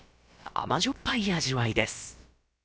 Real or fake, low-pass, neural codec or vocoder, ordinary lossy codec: fake; none; codec, 16 kHz, about 1 kbps, DyCAST, with the encoder's durations; none